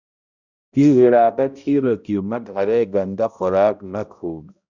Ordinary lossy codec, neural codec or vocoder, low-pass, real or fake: Opus, 64 kbps; codec, 16 kHz, 0.5 kbps, X-Codec, HuBERT features, trained on balanced general audio; 7.2 kHz; fake